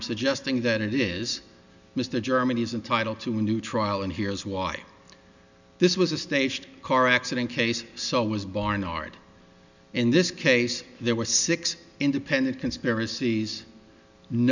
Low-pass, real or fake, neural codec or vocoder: 7.2 kHz; real; none